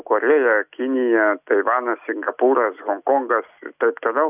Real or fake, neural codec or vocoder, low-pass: real; none; 3.6 kHz